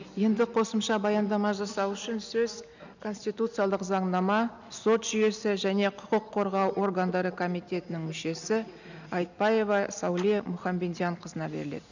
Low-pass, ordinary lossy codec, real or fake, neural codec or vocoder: 7.2 kHz; none; real; none